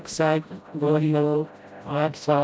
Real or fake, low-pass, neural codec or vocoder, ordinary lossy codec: fake; none; codec, 16 kHz, 0.5 kbps, FreqCodec, smaller model; none